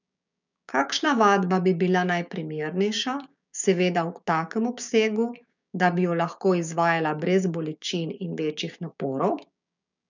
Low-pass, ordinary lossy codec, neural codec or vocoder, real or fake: 7.2 kHz; none; codec, 16 kHz, 6 kbps, DAC; fake